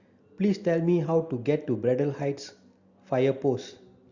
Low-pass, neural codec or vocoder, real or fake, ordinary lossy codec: 7.2 kHz; none; real; Opus, 64 kbps